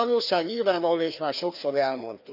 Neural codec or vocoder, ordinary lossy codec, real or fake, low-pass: codec, 16 kHz, 2 kbps, FreqCodec, larger model; none; fake; 5.4 kHz